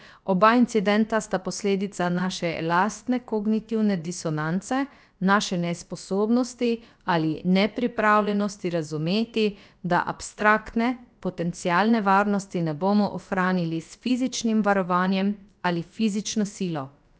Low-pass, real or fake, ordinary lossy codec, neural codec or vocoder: none; fake; none; codec, 16 kHz, about 1 kbps, DyCAST, with the encoder's durations